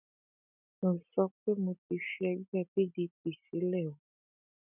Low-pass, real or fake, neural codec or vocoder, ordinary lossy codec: 3.6 kHz; real; none; none